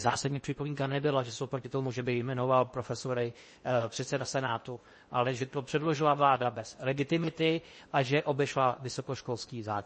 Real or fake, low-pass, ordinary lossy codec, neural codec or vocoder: fake; 10.8 kHz; MP3, 32 kbps; codec, 16 kHz in and 24 kHz out, 0.8 kbps, FocalCodec, streaming, 65536 codes